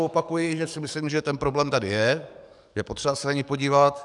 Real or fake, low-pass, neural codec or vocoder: fake; 10.8 kHz; codec, 44.1 kHz, 7.8 kbps, DAC